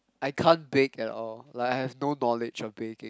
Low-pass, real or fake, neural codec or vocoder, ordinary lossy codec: none; real; none; none